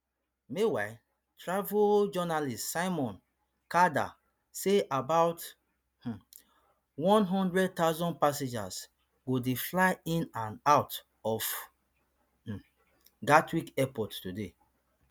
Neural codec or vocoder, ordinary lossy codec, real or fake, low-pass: none; none; real; none